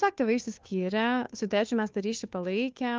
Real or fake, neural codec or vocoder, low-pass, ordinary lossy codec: fake; codec, 16 kHz, 2 kbps, FunCodec, trained on Chinese and English, 25 frames a second; 7.2 kHz; Opus, 24 kbps